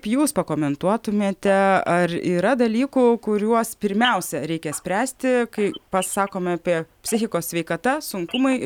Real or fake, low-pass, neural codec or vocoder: real; 19.8 kHz; none